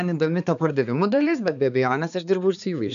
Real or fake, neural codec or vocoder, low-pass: fake; codec, 16 kHz, 4 kbps, X-Codec, HuBERT features, trained on balanced general audio; 7.2 kHz